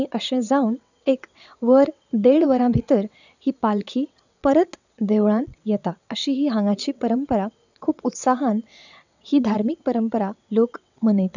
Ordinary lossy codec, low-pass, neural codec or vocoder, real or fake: none; 7.2 kHz; vocoder, 22.05 kHz, 80 mel bands, WaveNeXt; fake